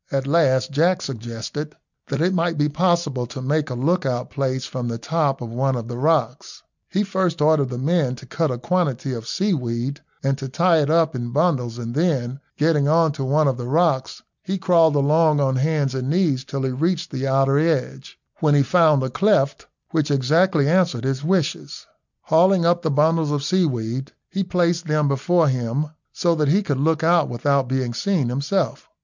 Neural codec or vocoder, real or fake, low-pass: none; real; 7.2 kHz